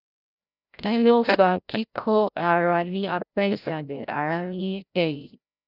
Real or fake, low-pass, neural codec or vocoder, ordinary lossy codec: fake; 5.4 kHz; codec, 16 kHz, 0.5 kbps, FreqCodec, larger model; none